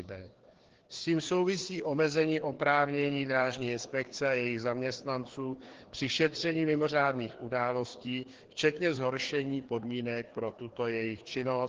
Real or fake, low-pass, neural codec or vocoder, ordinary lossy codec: fake; 7.2 kHz; codec, 16 kHz, 2 kbps, FreqCodec, larger model; Opus, 16 kbps